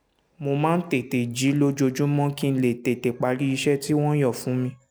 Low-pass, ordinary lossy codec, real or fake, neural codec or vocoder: none; none; real; none